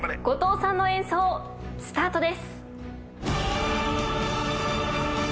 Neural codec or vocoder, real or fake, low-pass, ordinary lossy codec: none; real; none; none